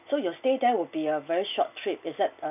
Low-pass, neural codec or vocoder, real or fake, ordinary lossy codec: 3.6 kHz; none; real; none